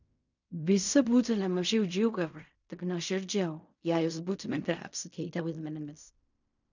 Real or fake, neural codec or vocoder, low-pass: fake; codec, 16 kHz in and 24 kHz out, 0.4 kbps, LongCat-Audio-Codec, fine tuned four codebook decoder; 7.2 kHz